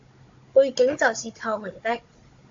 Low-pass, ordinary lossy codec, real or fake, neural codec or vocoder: 7.2 kHz; AAC, 48 kbps; fake; codec, 16 kHz, 16 kbps, FunCodec, trained on Chinese and English, 50 frames a second